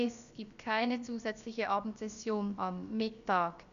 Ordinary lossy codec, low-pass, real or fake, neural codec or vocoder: none; 7.2 kHz; fake; codec, 16 kHz, about 1 kbps, DyCAST, with the encoder's durations